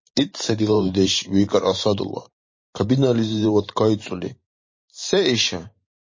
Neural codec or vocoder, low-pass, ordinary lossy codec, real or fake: vocoder, 44.1 kHz, 128 mel bands every 512 samples, BigVGAN v2; 7.2 kHz; MP3, 32 kbps; fake